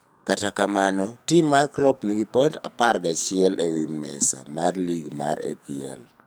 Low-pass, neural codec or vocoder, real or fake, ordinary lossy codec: none; codec, 44.1 kHz, 2.6 kbps, SNAC; fake; none